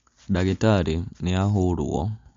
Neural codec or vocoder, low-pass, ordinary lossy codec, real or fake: none; 7.2 kHz; MP3, 48 kbps; real